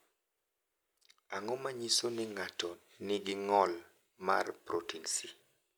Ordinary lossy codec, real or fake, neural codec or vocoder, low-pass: none; real; none; none